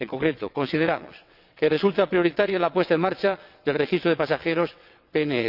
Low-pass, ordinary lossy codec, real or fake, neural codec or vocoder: 5.4 kHz; none; fake; vocoder, 22.05 kHz, 80 mel bands, WaveNeXt